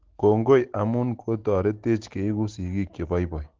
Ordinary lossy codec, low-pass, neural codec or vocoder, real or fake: Opus, 16 kbps; 7.2 kHz; none; real